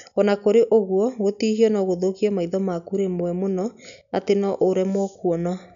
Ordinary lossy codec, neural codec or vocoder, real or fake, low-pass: none; none; real; 7.2 kHz